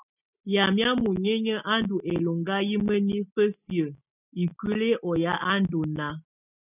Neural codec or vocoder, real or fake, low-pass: none; real; 3.6 kHz